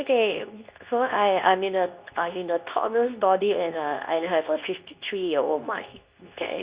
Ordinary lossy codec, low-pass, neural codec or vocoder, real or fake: Opus, 64 kbps; 3.6 kHz; codec, 24 kHz, 0.9 kbps, WavTokenizer, medium speech release version 2; fake